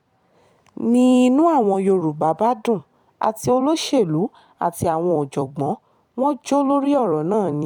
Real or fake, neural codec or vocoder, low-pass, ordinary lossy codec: fake; vocoder, 44.1 kHz, 128 mel bands every 256 samples, BigVGAN v2; 19.8 kHz; none